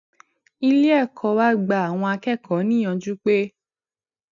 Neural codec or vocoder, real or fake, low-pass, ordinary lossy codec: none; real; 7.2 kHz; none